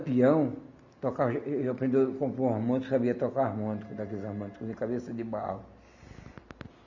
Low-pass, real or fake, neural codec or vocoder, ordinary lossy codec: 7.2 kHz; real; none; none